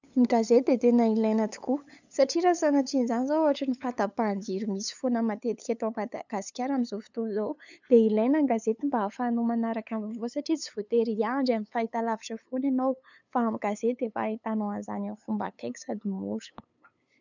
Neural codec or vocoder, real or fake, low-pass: codec, 16 kHz, 8 kbps, FunCodec, trained on LibriTTS, 25 frames a second; fake; 7.2 kHz